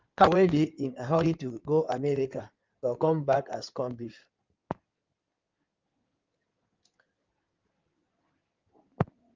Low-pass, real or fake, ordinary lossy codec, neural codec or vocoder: 7.2 kHz; fake; Opus, 24 kbps; codec, 16 kHz in and 24 kHz out, 2.2 kbps, FireRedTTS-2 codec